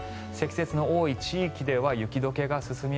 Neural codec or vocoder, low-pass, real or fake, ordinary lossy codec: none; none; real; none